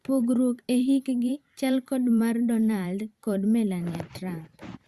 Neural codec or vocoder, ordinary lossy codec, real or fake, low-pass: vocoder, 44.1 kHz, 128 mel bands every 512 samples, BigVGAN v2; Opus, 64 kbps; fake; 14.4 kHz